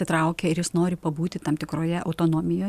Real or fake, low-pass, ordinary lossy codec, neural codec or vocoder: real; 14.4 kHz; Opus, 64 kbps; none